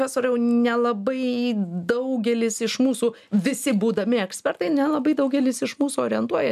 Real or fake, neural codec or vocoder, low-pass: real; none; 14.4 kHz